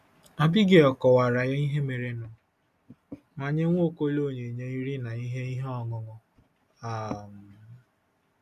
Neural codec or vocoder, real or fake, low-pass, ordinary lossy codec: none; real; 14.4 kHz; none